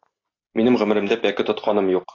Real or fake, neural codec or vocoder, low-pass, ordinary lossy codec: real; none; 7.2 kHz; AAC, 32 kbps